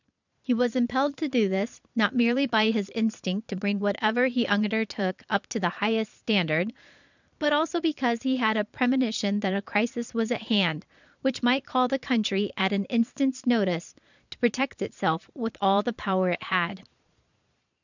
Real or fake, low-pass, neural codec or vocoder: fake; 7.2 kHz; vocoder, 22.05 kHz, 80 mel bands, Vocos